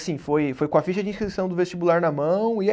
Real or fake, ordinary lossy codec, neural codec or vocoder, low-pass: real; none; none; none